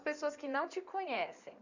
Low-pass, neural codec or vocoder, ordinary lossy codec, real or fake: 7.2 kHz; codec, 16 kHz in and 24 kHz out, 2.2 kbps, FireRedTTS-2 codec; none; fake